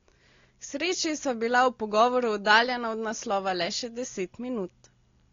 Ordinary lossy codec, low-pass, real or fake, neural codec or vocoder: AAC, 32 kbps; 7.2 kHz; real; none